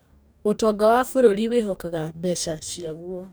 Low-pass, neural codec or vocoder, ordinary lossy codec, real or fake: none; codec, 44.1 kHz, 2.6 kbps, DAC; none; fake